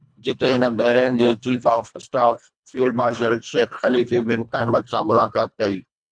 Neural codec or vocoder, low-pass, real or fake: codec, 24 kHz, 1.5 kbps, HILCodec; 9.9 kHz; fake